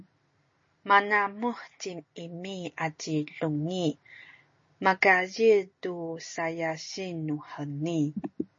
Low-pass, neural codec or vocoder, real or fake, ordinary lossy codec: 7.2 kHz; none; real; MP3, 32 kbps